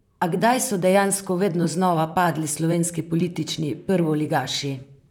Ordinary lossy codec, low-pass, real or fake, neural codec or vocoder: none; 19.8 kHz; fake; vocoder, 44.1 kHz, 128 mel bands, Pupu-Vocoder